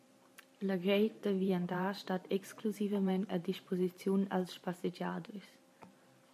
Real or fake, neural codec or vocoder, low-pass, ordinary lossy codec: fake; vocoder, 44.1 kHz, 128 mel bands every 512 samples, BigVGAN v2; 14.4 kHz; MP3, 64 kbps